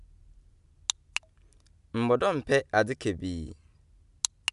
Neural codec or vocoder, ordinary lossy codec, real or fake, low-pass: none; none; real; 10.8 kHz